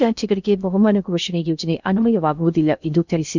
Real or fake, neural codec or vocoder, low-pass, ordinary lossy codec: fake; codec, 16 kHz in and 24 kHz out, 0.6 kbps, FocalCodec, streaming, 2048 codes; 7.2 kHz; none